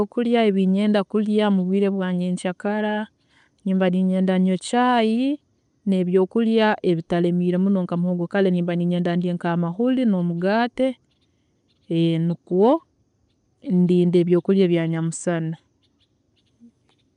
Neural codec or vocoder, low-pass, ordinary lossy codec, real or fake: none; 10.8 kHz; none; real